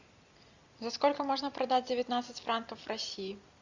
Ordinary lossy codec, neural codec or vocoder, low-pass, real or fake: Opus, 64 kbps; none; 7.2 kHz; real